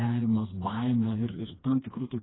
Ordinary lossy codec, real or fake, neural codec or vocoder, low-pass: AAC, 16 kbps; fake; codec, 16 kHz, 2 kbps, FreqCodec, smaller model; 7.2 kHz